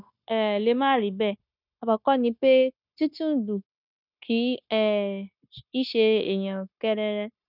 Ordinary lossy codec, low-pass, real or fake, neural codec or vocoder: none; 5.4 kHz; fake; codec, 16 kHz, 0.9 kbps, LongCat-Audio-Codec